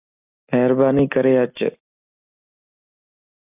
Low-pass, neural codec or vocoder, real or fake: 3.6 kHz; none; real